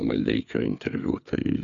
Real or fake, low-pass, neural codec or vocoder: fake; 7.2 kHz; codec, 16 kHz, 4 kbps, FreqCodec, larger model